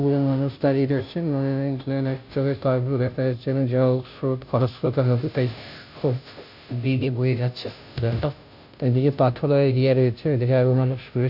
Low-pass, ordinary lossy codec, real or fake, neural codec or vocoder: 5.4 kHz; none; fake; codec, 16 kHz, 0.5 kbps, FunCodec, trained on Chinese and English, 25 frames a second